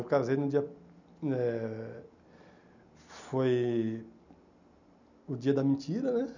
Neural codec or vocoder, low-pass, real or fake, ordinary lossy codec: none; 7.2 kHz; real; none